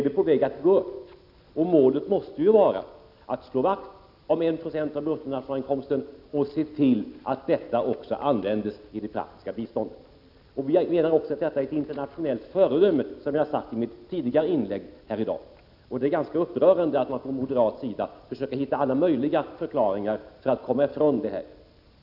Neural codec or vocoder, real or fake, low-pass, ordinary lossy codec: none; real; 5.4 kHz; none